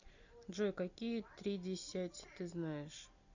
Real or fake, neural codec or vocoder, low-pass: real; none; 7.2 kHz